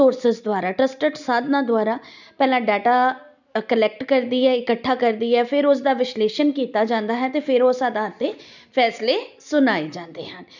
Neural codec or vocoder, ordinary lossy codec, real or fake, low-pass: none; none; real; 7.2 kHz